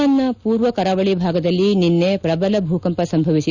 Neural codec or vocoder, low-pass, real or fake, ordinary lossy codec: none; 7.2 kHz; real; Opus, 64 kbps